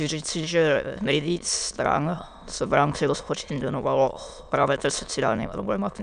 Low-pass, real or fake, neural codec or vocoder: 9.9 kHz; fake; autoencoder, 22.05 kHz, a latent of 192 numbers a frame, VITS, trained on many speakers